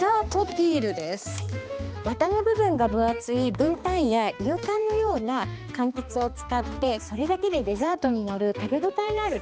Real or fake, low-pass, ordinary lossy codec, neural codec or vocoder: fake; none; none; codec, 16 kHz, 2 kbps, X-Codec, HuBERT features, trained on balanced general audio